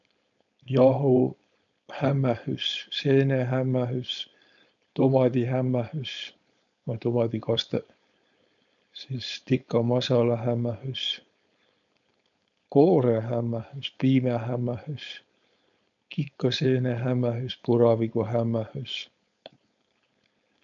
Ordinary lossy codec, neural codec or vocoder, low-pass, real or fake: MP3, 96 kbps; codec, 16 kHz, 4.8 kbps, FACodec; 7.2 kHz; fake